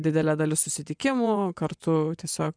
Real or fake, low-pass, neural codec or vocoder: fake; 9.9 kHz; vocoder, 24 kHz, 100 mel bands, Vocos